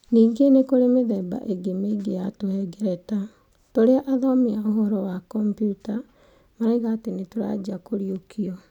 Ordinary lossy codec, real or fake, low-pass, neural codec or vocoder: none; real; 19.8 kHz; none